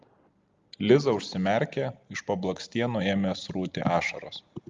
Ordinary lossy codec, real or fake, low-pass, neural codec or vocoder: Opus, 32 kbps; real; 7.2 kHz; none